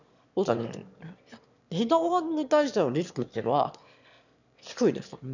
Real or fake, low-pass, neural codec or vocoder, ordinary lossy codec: fake; 7.2 kHz; autoencoder, 22.05 kHz, a latent of 192 numbers a frame, VITS, trained on one speaker; none